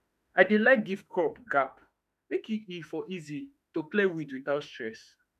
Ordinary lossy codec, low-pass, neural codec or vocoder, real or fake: none; 14.4 kHz; autoencoder, 48 kHz, 32 numbers a frame, DAC-VAE, trained on Japanese speech; fake